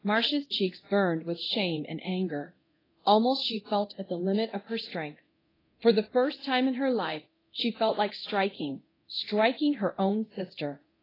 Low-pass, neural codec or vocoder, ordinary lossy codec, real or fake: 5.4 kHz; codec, 24 kHz, 0.9 kbps, DualCodec; AAC, 24 kbps; fake